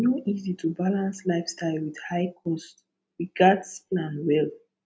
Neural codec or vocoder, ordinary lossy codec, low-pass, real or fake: none; none; none; real